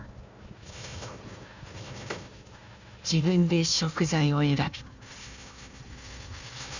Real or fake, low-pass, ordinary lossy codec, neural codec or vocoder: fake; 7.2 kHz; none; codec, 16 kHz, 1 kbps, FunCodec, trained on Chinese and English, 50 frames a second